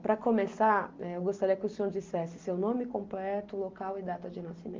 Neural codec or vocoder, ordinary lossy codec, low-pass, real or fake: none; Opus, 32 kbps; 7.2 kHz; real